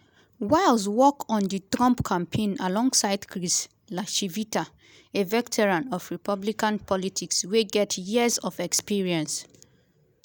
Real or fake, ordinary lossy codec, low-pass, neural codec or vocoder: real; none; none; none